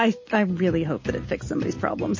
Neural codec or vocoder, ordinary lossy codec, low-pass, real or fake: none; MP3, 32 kbps; 7.2 kHz; real